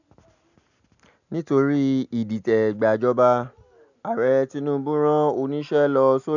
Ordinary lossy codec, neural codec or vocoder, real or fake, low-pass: none; none; real; 7.2 kHz